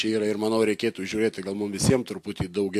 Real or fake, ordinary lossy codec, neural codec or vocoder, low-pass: real; MP3, 64 kbps; none; 14.4 kHz